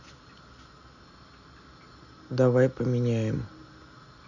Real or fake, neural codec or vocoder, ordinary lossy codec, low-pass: real; none; none; 7.2 kHz